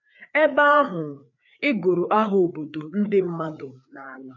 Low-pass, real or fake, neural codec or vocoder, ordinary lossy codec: 7.2 kHz; fake; codec, 16 kHz, 4 kbps, FreqCodec, larger model; none